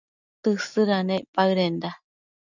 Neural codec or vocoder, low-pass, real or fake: none; 7.2 kHz; real